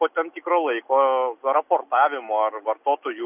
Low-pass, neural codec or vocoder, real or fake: 3.6 kHz; none; real